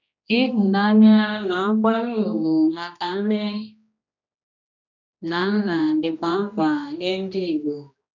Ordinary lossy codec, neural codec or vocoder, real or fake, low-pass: none; codec, 16 kHz, 1 kbps, X-Codec, HuBERT features, trained on general audio; fake; 7.2 kHz